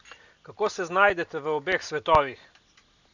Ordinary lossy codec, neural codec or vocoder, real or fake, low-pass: none; none; real; 7.2 kHz